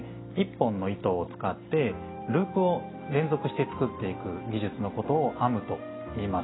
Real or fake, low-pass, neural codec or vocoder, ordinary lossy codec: real; 7.2 kHz; none; AAC, 16 kbps